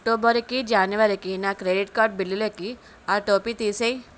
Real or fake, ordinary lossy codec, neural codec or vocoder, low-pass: real; none; none; none